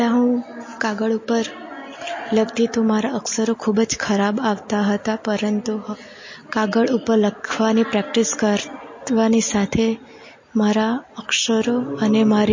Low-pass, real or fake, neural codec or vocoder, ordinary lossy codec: 7.2 kHz; real; none; MP3, 32 kbps